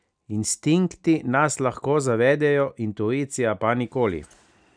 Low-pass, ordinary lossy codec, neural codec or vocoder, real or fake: 9.9 kHz; none; none; real